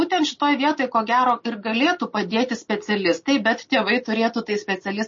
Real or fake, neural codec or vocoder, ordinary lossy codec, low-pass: real; none; MP3, 32 kbps; 7.2 kHz